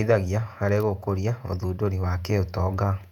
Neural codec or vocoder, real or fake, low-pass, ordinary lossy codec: vocoder, 48 kHz, 128 mel bands, Vocos; fake; 19.8 kHz; none